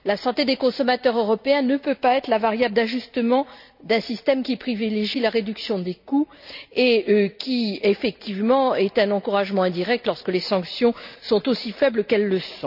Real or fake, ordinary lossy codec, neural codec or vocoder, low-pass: real; none; none; 5.4 kHz